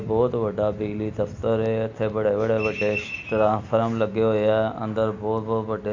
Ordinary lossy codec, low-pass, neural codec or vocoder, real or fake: MP3, 48 kbps; 7.2 kHz; none; real